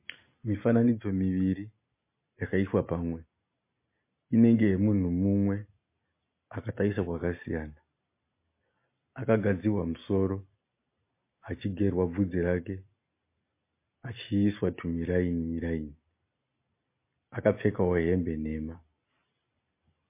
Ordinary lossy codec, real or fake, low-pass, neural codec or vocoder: MP3, 24 kbps; real; 3.6 kHz; none